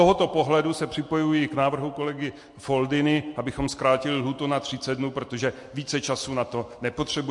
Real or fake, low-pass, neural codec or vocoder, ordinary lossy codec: real; 10.8 kHz; none; MP3, 48 kbps